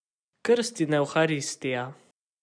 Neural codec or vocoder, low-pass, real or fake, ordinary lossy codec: none; 9.9 kHz; real; none